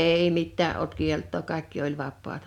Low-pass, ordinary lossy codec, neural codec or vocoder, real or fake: 19.8 kHz; none; none; real